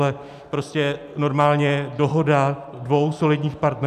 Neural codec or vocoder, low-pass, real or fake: none; 14.4 kHz; real